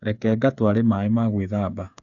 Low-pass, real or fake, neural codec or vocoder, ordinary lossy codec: 7.2 kHz; fake; codec, 16 kHz, 8 kbps, FreqCodec, smaller model; none